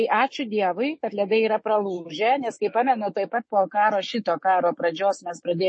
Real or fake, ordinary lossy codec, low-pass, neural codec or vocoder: fake; MP3, 32 kbps; 9.9 kHz; autoencoder, 48 kHz, 128 numbers a frame, DAC-VAE, trained on Japanese speech